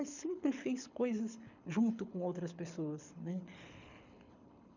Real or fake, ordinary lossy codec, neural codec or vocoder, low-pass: fake; none; codec, 24 kHz, 6 kbps, HILCodec; 7.2 kHz